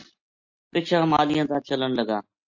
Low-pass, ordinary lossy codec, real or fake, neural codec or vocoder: 7.2 kHz; MP3, 48 kbps; real; none